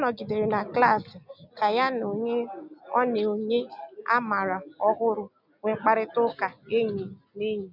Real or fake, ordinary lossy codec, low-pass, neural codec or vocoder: real; none; 5.4 kHz; none